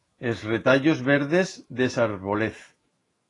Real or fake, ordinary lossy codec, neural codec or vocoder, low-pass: fake; AAC, 32 kbps; autoencoder, 48 kHz, 128 numbers a frame, DAC-VAE, trained on Japanese speech; 10.8 kHz